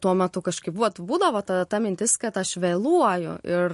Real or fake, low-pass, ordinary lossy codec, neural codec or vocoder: real; 14.4 kHz; MP3, 48 kbps; none